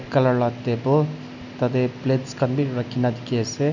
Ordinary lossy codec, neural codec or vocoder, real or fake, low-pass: none; none; real; 7.2 kHz